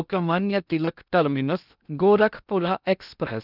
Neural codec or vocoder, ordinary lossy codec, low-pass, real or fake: codec, 16 kHz in and 24 kHz out, 0.6 kbps, FocalCodec, streaming, 2048 codes; none; 5.4 kHz; fake